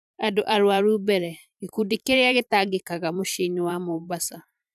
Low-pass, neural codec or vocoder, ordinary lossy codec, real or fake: 14.4 kHz; vocoder, 44.1 kHz, 128 mel bands every 512 samples, BigVGAN v2; none; fake